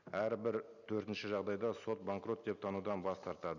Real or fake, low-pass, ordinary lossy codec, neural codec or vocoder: real; 7.2 kHz; none; none